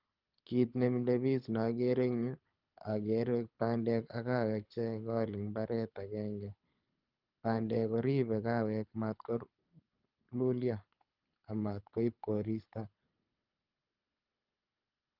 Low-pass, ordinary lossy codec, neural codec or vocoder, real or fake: 5.4 kHz; Opus, 24 kbps; codec, 24 kHz, 6 kbps, HILCodec; fake